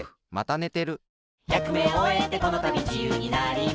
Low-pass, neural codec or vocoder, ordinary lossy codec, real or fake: none; none; none; real